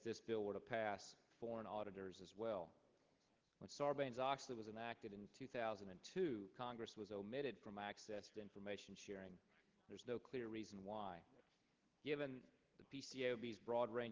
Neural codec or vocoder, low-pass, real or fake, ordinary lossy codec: none; 7.2 kHz; real; Opus, 16 kbps